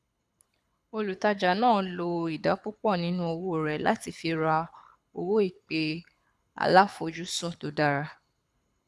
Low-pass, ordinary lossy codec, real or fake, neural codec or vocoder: none; none; fake; codec, 24 kHz, 6 kbps, HILCodec